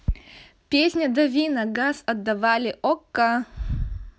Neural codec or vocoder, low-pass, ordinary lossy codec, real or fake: none; none; none; real